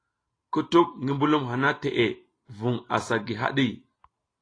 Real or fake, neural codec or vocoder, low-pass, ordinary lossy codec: real; none; 9.9 kHz; AAC, 32 kbps